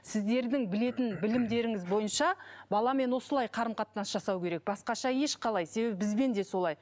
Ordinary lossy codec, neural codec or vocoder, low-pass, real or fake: none; none; none; real